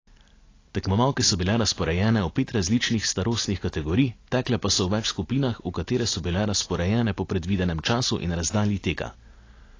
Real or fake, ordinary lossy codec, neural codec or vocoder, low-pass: real; AAC, 32 kbps; none; 7.2 kHz